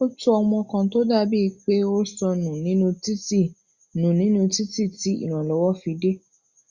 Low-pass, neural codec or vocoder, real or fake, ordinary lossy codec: 7.2 kHz; none; real; Opus, 64 kbps